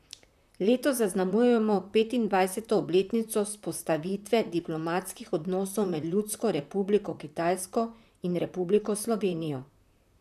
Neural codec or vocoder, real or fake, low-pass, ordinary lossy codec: vocoder, 44.1 kHz, 128 mel bands, Pupu-Vocoder; fake; 14.4 kHz; none